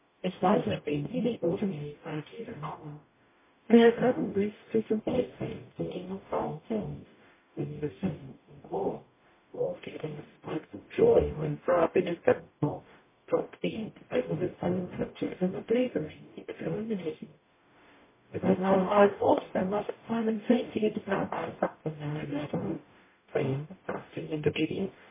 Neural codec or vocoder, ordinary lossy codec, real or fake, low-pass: codec, 44.1 kHz, 0.9 kbps, DAC; MP3, 16 kbps; fake; 3.6 kHz